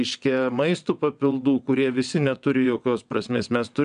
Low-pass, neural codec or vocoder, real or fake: 9.9 kHz; vocoder, 22.05 kHz, 80 mel bands, WaveNeXt; fake